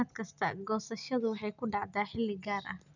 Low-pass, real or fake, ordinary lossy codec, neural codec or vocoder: 7.2 kHz; real; none; none